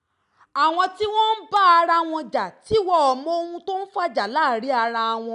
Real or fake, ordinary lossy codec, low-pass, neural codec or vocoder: real; none; 10.8 kHz; none